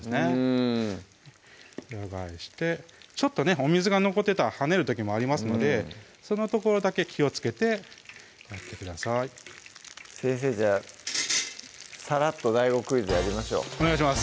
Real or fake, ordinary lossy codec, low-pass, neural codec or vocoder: real; none; none; none